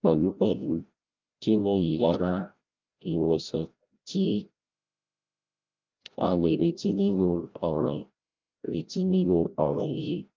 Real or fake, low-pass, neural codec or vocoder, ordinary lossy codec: fake; 7.2 kHz; codec, 16 kHz, 0.5 kbps, FreqCodec, larger model; Opus, 24 kbps